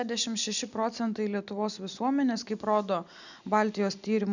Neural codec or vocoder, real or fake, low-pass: none; real; 7.2 kHz